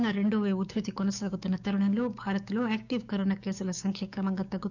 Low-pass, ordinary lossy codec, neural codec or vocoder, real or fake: 7.2 kHz; none; codec, 16 kHz, 6 kbps, DAC; fake